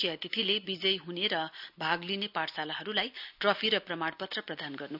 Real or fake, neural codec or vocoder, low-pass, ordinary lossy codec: real; none; 5.4 kHz; none